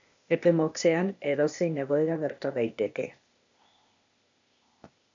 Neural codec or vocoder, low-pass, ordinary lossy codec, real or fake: codec, 16 kHz, 0.8 kbps, ZipCodec; 7.2 kHz; AAC, 64 kbps; fake